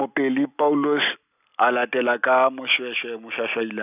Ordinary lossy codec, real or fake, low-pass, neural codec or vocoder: none; real; 3.6 kHz; none